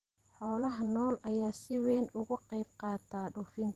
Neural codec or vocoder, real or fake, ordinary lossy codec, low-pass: vocoder, 44.1 kHz, 128 mel bands every 512 samples, BigVGAN v2; fake; Opus, 16 kbps; 14.4 kHz